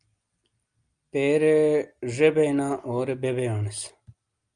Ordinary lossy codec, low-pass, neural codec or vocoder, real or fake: Opus, 32 kbps; 9.9 kHz; none; real